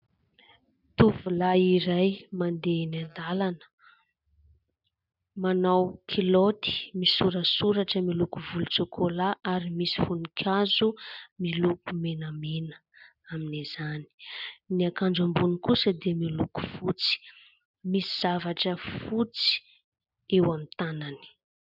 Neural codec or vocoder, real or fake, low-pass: none; real; 5.4 kHz